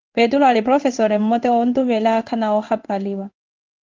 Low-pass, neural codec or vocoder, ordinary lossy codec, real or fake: 7.2 kHz; codec, 16 kHz in and 24 kHz out, 1 kbps, XY-Tokenizer; Opus, 32 kbps; fake